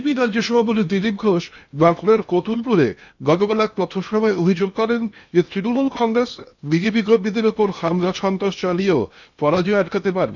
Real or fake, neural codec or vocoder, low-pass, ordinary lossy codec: fake; codec, 16 kHz in and 24 kHz out, 0.8 kbps, FocalCodec, streaming, 65536 codes; 7.2 kHz; none